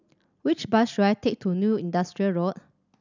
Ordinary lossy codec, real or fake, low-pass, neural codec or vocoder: none; real; 7.2 kHz; none